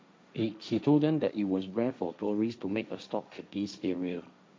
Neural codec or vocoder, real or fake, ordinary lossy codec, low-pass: codec, 16 kHz, 1.1 kbps, Voila-Tokenizer; fake; none; none